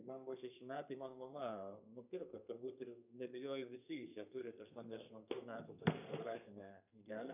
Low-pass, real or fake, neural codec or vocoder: 3.6 kHz; fake; codec, 32 kHz, 1.9 kbps, SNAC